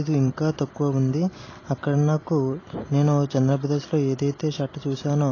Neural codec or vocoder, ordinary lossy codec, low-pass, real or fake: none; AAC, 32 kbps; 7.2 kHz; real